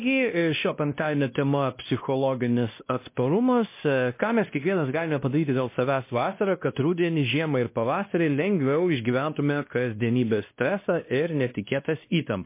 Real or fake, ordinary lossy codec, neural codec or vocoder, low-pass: fake; MP3, 24 kbps; codec, 16 kHz, 1 kbps, X-Codec, WavLM features, trained on Multilingual LibriSpeech; 3.6 kHz